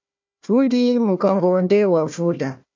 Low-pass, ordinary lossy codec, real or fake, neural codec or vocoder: 7.2 kHz; MP3, 48 kbps; fake; codec, 16 kHz, 1 kbps, FunCodec, trained on Chinese and English, 50 frames a second